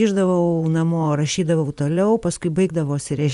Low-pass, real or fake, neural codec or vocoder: 10.8 kHz; fake; vocoder, 24 kHz, 100 mel bands, Vocos